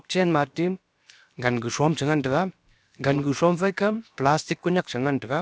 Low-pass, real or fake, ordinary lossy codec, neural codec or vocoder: none; fake; none; codec, 16 kHz, about 1 kbps, DyCAST, with the encoder's durations